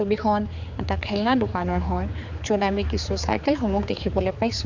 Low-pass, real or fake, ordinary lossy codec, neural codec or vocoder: 7.2 kHz; fake; none; codec, 16 kHz, 4 kbps, X-Codec, HuBERT features, trained on general audio